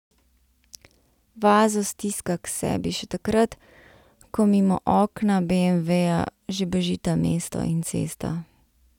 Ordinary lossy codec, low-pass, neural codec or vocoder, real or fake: none; 19.8 kHz; none; real